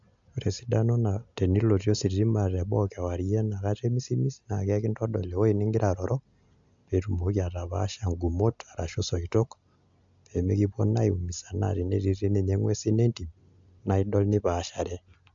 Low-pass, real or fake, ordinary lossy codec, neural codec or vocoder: 7.2 kHz; real; AAC, 64 kbps; none